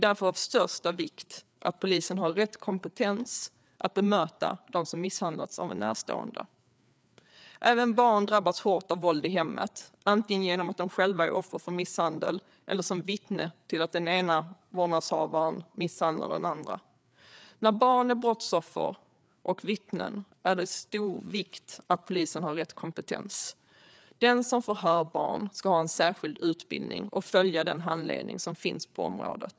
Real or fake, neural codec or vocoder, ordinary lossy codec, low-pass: fake; codec, 16 kHz, 4 kbps, FreqCodec, larger model; none; none